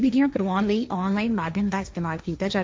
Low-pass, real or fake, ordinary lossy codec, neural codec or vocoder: none; fake; none; codec, 16 kHz, 1.1 kbps, Voila-Tokenizer